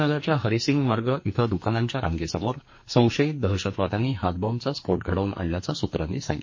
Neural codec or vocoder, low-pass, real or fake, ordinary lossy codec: codec, 44.1 kHz, 2.6 kbps, SNAC; 7.2 kHz; fake; MP3, 32 kbps